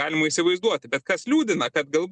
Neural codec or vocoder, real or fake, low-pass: none; real; 10.8 kHz